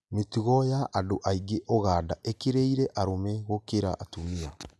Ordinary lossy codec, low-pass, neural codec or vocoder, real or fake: none; none; none; real